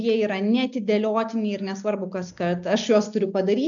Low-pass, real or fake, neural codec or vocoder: 7.2 kHz; real; none